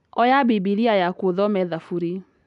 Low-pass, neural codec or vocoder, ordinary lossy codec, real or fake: 10.8 kHz; none; none; real